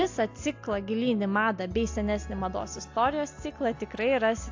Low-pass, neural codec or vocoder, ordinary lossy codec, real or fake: 7.2 kHz; none; AAC, 48 kbps; real